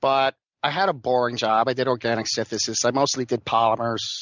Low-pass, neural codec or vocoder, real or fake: 7.2 kHz; none; real